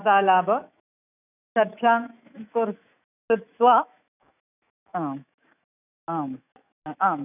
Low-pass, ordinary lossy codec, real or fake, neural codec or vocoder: 3.6 kHz; none; fake; autoencoder, 48 kHz, 128 numbers a frame, DAC-VAE, trained on Japanese speech